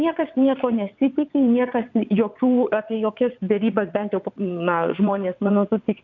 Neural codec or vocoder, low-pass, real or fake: vocoder, 22.05 kHz, 80 mel bands, WaveNeXt; 7.2 kHz; fake